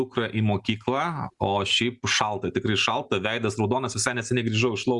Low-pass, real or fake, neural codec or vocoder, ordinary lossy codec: 10.8 kHz; real; none; Opus, 64 kbps